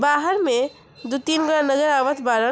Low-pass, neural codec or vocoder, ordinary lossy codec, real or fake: none; none; none; real